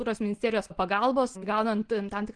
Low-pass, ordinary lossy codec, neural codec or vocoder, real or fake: 9.9 kHz; Opus, 16 kbps; none; real